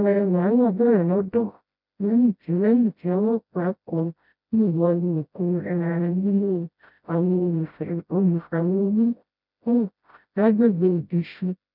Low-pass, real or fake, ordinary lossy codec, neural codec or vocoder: 5.4 kHz; fake; none; codec, 16 kHz, 0.5 kbps, FreqCodec, smaller model